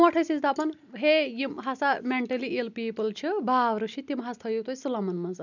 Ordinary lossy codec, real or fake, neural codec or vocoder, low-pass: none; real; none; 7.2 kHz